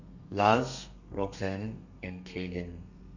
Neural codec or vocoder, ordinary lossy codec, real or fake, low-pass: codec, 32 kHz, 1.9 kbps, SNAC; none; fake; 7.2 kHz